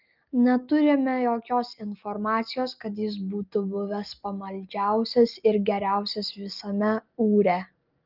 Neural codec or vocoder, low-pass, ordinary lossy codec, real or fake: none; 5.4 kHz; Opus, 24 kbps; real